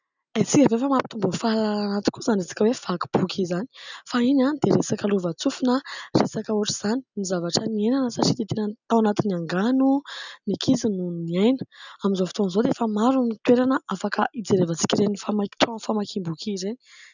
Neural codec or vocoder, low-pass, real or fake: none; 7.2 kHz; real